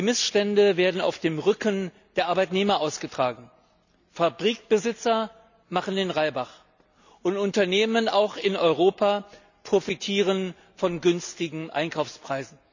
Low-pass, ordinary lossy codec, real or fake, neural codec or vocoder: 7.2 kHz; none; real; none